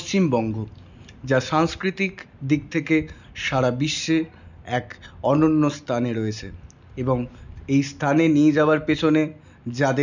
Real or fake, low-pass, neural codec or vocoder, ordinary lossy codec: real; 7.2 kHz; none; none